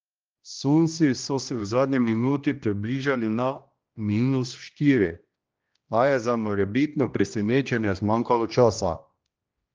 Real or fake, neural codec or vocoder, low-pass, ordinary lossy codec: fake; codec, 16 kHz, 1 kbps, X-Codec, HuBERT features, trained on general audio; 7.2 kHz; Opus, 24 kbps